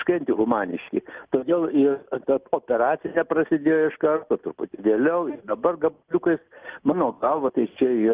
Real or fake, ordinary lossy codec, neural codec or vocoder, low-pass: real; Opus, 32 kbps; none; 3.6 kHz